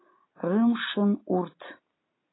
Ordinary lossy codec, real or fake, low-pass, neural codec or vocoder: AAC, 16 kbps; real; 7.2 kHz; none